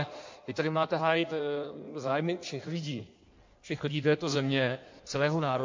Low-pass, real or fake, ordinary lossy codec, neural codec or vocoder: 7.2 kHz; fake; MP3, 48 kbps; codec, 16 kHz in and 24 kHz out, 1.1 kbps, FireRedTTS-2 codec